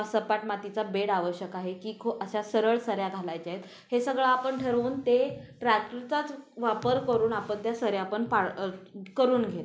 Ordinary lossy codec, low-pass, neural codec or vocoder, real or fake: none; none; none; real